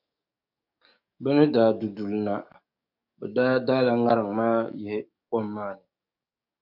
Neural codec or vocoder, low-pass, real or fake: codec, 44.1 kHz, 7.8 kbps, DAC; 5.4 kHz; fake